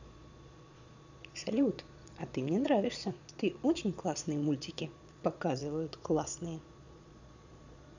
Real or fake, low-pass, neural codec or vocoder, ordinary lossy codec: fake; 7.2 kHz; vocoder, 22.05 kHz, 80 mel bands, Vocos; none